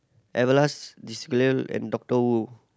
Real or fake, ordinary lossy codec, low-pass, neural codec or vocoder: real; none; none; none